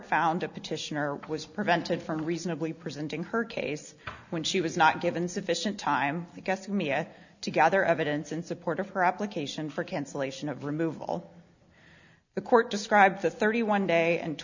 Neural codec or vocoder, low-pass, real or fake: none; 7.2 kHz; real